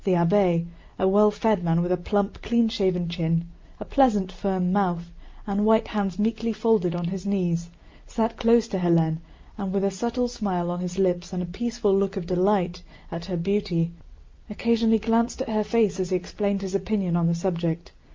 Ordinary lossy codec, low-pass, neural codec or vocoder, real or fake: Opus, 32 kbps; 7.2 kHz; none; real